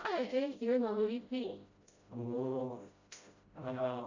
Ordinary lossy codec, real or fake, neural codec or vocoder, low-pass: Opus, 64 kbps; fake; codec, 16 kHz, 0.5 kbps, FreqCodec, smaller model; 7.2 kHz